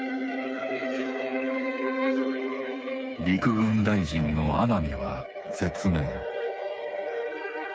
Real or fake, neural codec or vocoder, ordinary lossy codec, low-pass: fake; codec, 16 kHz, 4 kbps, FreqCodec, smaller model; none; none